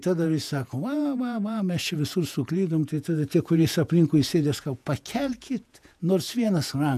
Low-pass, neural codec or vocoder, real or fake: 14.4 kHz; vocoder, 48 kHz, 128 mel bands, Vocos; fake